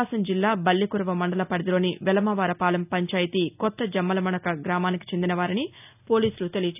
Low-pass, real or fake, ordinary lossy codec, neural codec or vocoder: 3.6 kHz; real; none; none